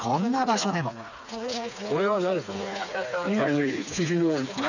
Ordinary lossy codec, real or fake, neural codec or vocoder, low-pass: none; fake; codec, 16 kHz, 4 kbps, FreqCodec, smaller model; 7.2 kHz